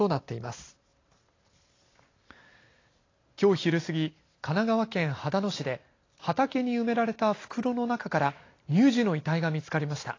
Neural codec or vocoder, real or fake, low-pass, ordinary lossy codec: none; real; 7.2 kHz; AAC, 32 kbps